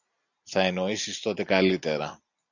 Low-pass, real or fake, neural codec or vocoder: 7.2 kHz; real; none